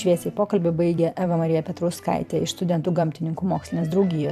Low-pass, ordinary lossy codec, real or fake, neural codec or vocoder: 14.4 kHz; AAC, 96 kbps; fake; vocoder, 44.1 kHz, 128 mel bands every 256 samples, BigVGAN v2